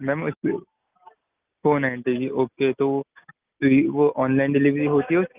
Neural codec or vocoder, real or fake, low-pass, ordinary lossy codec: none; real; 3.6 kHz; Opus, 32 kbps